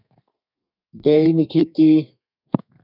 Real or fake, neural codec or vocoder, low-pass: fake; codec, 32 kHz, 1.9 kbps, SNAC; 5.4 kHz